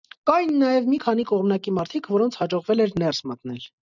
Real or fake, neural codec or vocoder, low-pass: real; none; 7.2 kHz